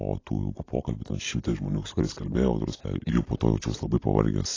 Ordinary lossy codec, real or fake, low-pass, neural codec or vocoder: AAC, 32 kbps; real; 7.2 kHz; none